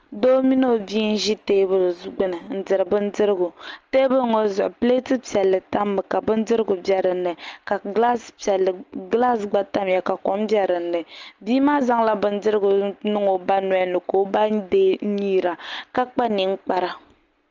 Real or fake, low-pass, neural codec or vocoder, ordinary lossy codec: real; 7.2 kHz; none; Opus, 24 kbps